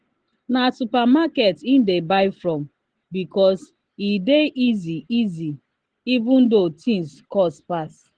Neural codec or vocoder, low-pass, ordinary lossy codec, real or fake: none; 9.9 kHz; Opus, 16 kbps; real